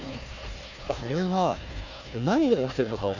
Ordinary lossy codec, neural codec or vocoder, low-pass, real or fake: none; codec, 16 kHz, 1 kbps, FunCodec, trained on Chinese and English, 50 frames a second; 7.2 kHz; fake